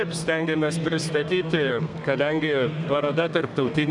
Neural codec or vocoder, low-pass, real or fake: codec, 32 kHz, 1.9 kbps, SNAC; 10.8 kHz; fake